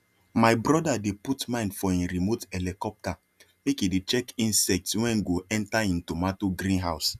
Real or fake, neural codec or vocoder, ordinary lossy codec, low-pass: real; none; none; 14.4 kHz